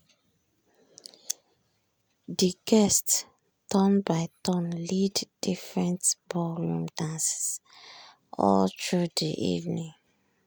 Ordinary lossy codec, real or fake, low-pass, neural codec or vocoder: none; real; none; none